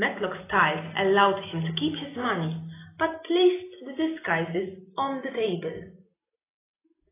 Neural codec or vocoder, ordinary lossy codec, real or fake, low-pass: none; AAC, 16 kbps; real; 3.6 kHz